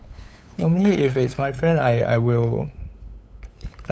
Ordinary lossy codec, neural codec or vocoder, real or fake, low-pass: none; codec, 16 kHz, 8 kbps, FunCodec, trained on LibriTTS, 25 frames a second; fake; none